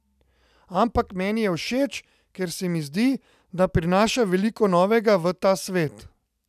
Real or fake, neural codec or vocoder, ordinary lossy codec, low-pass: real; none; none; 14.4 kHz